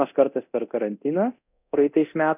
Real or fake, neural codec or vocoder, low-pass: fake; codec, 24 kHz, 0.9 kbps, DualCodec; 3.6 kHz